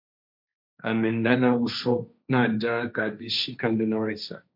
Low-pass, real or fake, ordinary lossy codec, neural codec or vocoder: 5.4 kHz; fake; MP3, 48 kbps; codec, 16 kHz, 1.1 kbps, Voila-Tokenizer